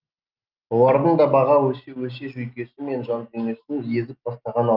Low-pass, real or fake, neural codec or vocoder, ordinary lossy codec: 5.4 kHz; real; none; Opus, 32 kbps